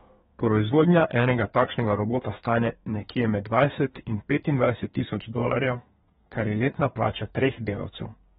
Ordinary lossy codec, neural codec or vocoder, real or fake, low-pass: AAC, 16 kbps; codec, 32 kHz, 1.9 kbps, SNAC; fake; 14.4 kHz